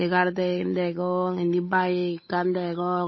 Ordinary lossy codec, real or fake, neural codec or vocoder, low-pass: MP3, 24 kbps; fake; codec, 16 kHz, 8 kbps, FunCodec, trained on Chinese and English, 25 frames a second; 7.2 kHz